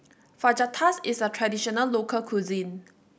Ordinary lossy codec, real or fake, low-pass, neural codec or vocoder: none; real; none; none